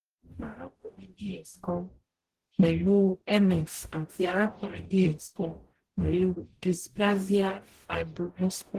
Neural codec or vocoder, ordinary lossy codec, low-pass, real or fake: codec, 44.1 kHz, 0.9 kbps, DAC; Opus, 16 kbps; 14.4 kHz; fake